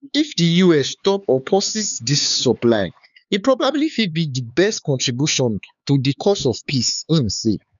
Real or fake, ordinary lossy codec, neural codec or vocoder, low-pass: fake; none; codec, 16 kHz, 4 kbps, X-Codec, HuBERT features, trained on LibriSpeech; 7.2 kHz